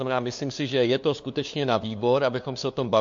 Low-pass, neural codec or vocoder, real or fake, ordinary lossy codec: 7.2 kHz; codec, 16 kHz, 2 kbps, FunCodec, trained on LibriTTS, 25 frames a second; fake; MP3, 48 kbps